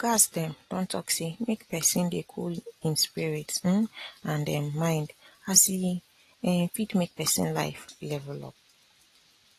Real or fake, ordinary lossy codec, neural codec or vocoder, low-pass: real; AAC, 48 kbps; none; 14.4 kHz